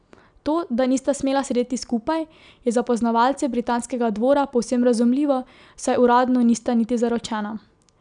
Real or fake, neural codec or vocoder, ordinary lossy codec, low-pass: real; none; none; 9.9 kHz